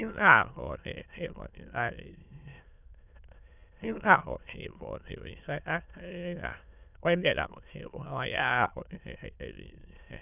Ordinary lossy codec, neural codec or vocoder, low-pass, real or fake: none; autoencoder, 22.05 kHz, a latent of 192 numbers a frame, VITS, trained on many speakers; 3.6 kHz; fake